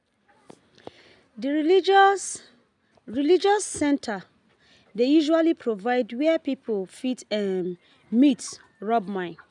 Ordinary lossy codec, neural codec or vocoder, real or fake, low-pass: none; none; real; 10.8 kHz